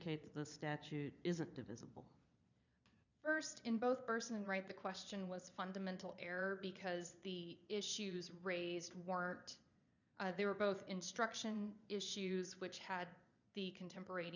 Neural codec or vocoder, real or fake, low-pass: vocoder, 22.05 kHz, 80 mel bands, Vocos; fake; 7.2 kHz